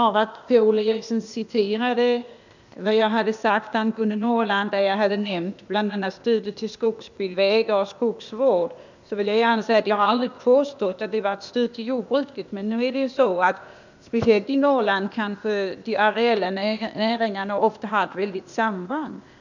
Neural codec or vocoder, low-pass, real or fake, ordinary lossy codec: codec, 16 kHz, 0.8 kbps, ZipCodec; 7.2 kHz; fake; none